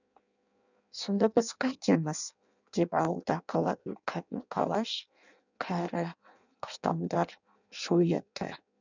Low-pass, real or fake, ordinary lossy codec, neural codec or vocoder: 7.2 kHz; fake; none; codec, 16 kHz in and 24 kHz out, 0.6 kbps, FireRedTTS-2 codec